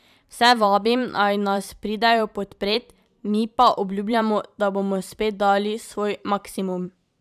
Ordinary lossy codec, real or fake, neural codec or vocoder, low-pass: none; fake; vocoder, 44.1 kHz, 128 mel bands every 512 samples, BigVGAN v2; 14.4 kHz